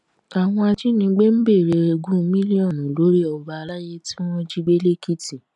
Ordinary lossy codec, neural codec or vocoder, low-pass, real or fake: none; none; 10.8 kHz; real